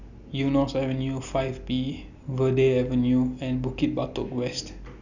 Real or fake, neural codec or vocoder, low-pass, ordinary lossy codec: real; none; 7.2 kHz; none